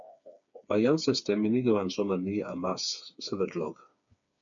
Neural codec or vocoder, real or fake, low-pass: codec, 16 kHz, 4 kbps, FreqCodec, smaller model; fake; 7.2 kHz